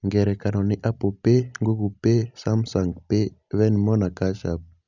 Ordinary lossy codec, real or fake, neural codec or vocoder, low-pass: none; real; none; 7.2 kHz